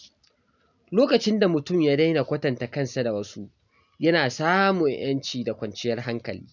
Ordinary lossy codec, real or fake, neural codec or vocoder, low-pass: none; real; none; 7.2 kHz